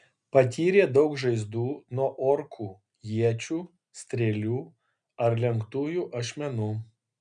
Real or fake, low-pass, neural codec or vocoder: real; 9.9 kHz; none